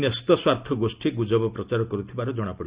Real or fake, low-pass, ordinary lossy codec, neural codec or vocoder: real; 3.6 kHz; Opus, 64 kbps; none